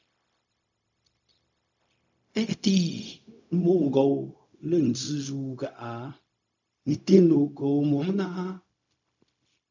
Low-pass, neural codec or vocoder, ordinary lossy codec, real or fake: 7.2 kHz; codec, 16 kHz, 0.4 kbps, LongCat-Audio-Codec; MP3, 64 kbps; fake